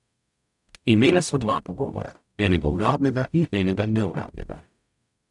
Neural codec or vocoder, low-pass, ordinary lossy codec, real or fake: codec, 44.1 kHz, 0.9 kbps, DAC; 10.8 kHz; none; fake